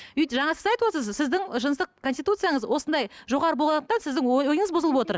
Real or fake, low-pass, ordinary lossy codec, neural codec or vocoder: real; none; none; none